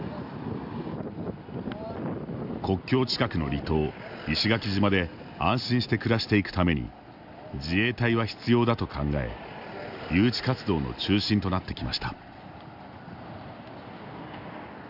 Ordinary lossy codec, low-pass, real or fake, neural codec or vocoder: none; 5.4 kHz; real; none